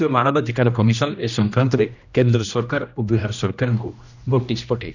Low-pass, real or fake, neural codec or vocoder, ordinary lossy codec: 7.2 kHz; fake; codec, 16 kHz, 1 kbps, X-Codec, HuBERT features, trained on general audio; none